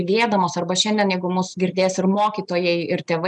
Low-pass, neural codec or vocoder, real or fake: 10.8 kHz; none; real